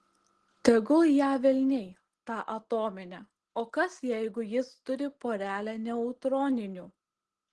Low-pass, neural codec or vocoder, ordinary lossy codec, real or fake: 10.8 kHz; none; Opus, 16 kbps; real